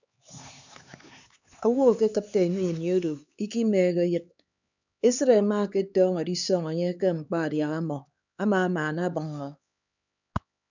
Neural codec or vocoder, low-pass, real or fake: codec, 16 kHz, 4 kbps, X-Codec, HuBERT features, trained on LibriSpeech; 7.2 kHz; fake